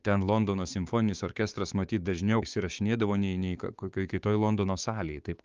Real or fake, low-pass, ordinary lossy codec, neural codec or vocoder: fake; 7.2 kHz; Opus, 32 kbps; codec, 16 kHz, 6 kbps, DAC